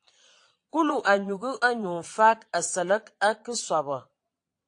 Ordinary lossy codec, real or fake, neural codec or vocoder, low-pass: AAC, 48 kbps; fake; vocoder, 22.05 kHz, 80 mel bands, Vocos; 9.9 kHz